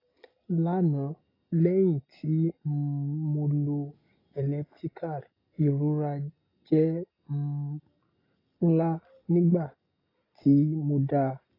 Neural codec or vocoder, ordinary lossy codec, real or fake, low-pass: none; AAC, 24 kbps; real; 5.4 kHz